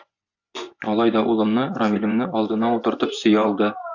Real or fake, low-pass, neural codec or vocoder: fake; 7.2 kHz; vocoder, 24 kHz, 100 mel bands, Vocos